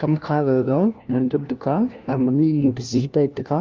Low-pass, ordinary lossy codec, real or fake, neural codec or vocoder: 7.2 kHz; Opus, 24 kbps; fake; codec, 16 kHz, 1 kbps, FunCodec, trained on LibriTTS, 50 frames a second